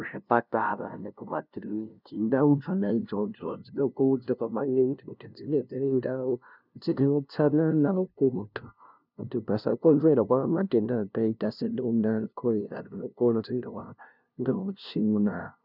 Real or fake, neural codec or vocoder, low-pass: fake; codec, 16 kHz, 0.5 kbps, FunCodec, trained on LibriTTS, 25 frames a second; 5.4 kHz